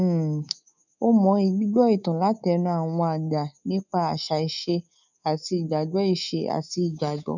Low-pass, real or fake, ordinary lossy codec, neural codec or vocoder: 7.2 kHz; fake; none; codec, 24 kHz, 3.1 kbps, DualCodec